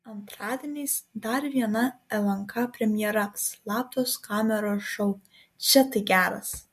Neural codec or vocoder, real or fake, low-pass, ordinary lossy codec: none; real; 14.4 kHz; MP3, 64 kbps